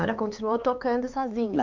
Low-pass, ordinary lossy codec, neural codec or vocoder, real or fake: 7.2 kHz; MP3, 64 kbps; codec, 16 kHz, 4 kbps, X-Codec, HuBERT features, trained on LibriSpeech; fake